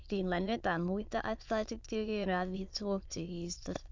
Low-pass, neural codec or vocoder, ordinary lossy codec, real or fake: 7.2 kHz; autoencoder, 22.05 kHz, a latent of 192 numbers a frame, VITS, trained on many speakers; MP3, 64 kbps; fake